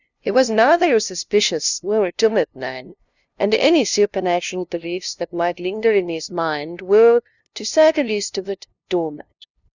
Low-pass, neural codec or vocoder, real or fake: 7.2 kHz; codec, 16 kHz, 0.5 kbps, FunCodec, trained on LibriTTS, 25 frames a second; fake